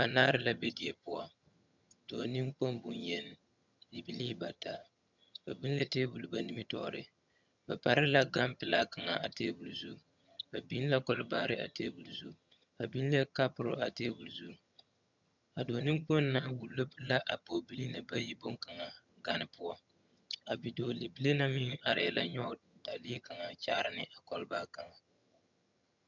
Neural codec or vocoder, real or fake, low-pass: vocoder, 22.05 kHz, 80 mel bands, HiFi-GAN; fake; 7.2 kHz